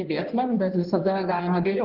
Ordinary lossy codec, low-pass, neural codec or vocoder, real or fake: Opus, 16 kbps; 5.4 kHz; codec, 16 kHz in and 24 kHz out, 1.1 kbps, FireRedTTS-2 codec; fake